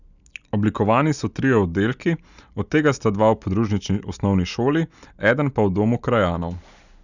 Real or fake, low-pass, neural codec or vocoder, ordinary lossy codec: real; 7.2 kHz; none; none